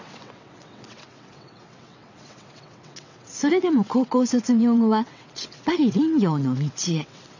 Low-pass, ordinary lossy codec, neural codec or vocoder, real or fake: 7.2 kHz; none; vocoder, 22.05 kHz, 80 mel bands, WaveNeXt; fake